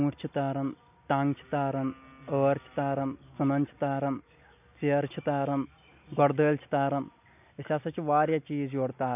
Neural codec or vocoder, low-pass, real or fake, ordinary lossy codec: none; 3.6 kHz; real; none